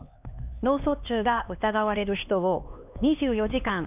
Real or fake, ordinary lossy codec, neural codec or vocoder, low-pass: fake; none; codec, 16 kHz, 2 kbps, X-Codec, HuBERT features, trained on LibriSpeech; 3.6 kHz